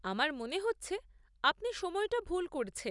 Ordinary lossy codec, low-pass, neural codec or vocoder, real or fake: none; 10.8 kHz; none; real